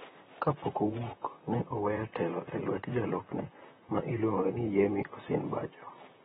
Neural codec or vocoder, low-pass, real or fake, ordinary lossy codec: vocoder, 44.1 kHz, 128 mel bands, Pupu-Vocoder; 19.8 kHz; fake; AAC, 16 kbps